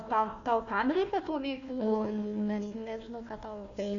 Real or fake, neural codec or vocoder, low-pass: fake; codec, 16 kHz, 1 kbps, FunCodec, trained on Chinese and English, 50 frames a second; 7.2 kHz